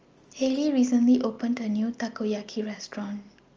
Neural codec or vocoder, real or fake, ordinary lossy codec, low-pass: none; real; Opus, 24 kbps; 7.2 kHz